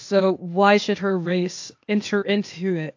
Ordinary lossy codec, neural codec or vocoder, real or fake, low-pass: AAC, 48 kbps; codec, 16 kHz, 0.8 kbps, ZipCodec; fake; 7.2 kHz